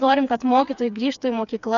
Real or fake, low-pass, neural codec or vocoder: fake; 7.2 kHz; codec, 16 kHz, 4 kbps, FreqCodec, smaller model